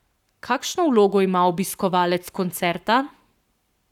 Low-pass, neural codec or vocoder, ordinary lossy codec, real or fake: 19.8 kHz; codec, 44.1 kHz, 7.8 kbps, Pupu-Codec; none; fake